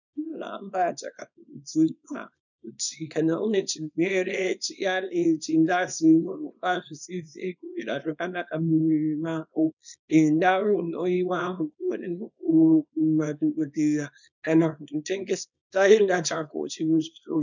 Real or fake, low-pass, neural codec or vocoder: fake; 7.2 kHz; codec, 24 kHz, 0.9 kbps, WavTokenizer, small release